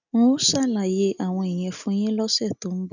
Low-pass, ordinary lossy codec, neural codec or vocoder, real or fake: 7.2 kHz; none; none; real